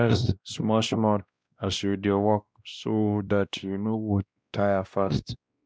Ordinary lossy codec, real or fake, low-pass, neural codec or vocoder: none; fake; none; codec, 16 kHz, 1 kbps, X-Codec, WavLM features, trained on Multilingual LibriSpeech